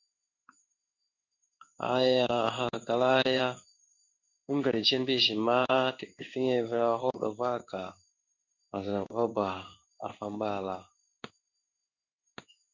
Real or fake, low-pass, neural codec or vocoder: fake; 7.2 kHz; codec, 16 kHz in and 24 kHz out, 1 kbps, XY-Tokenizer